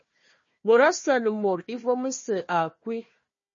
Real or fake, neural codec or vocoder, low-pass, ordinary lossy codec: fake; codec, 16 kHz, 1 kbps, FunCodec, trained on Chinese and English, 50 frames a second; 7.2 kHz; MP3, 32 kbps